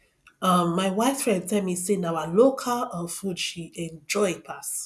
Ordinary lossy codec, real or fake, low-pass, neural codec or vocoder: none; real; none; none